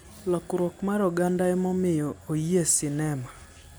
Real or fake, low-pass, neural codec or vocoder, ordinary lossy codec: real; none; none; none